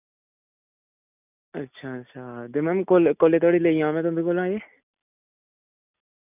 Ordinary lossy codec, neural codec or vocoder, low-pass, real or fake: none; none; 3.6 kHz; real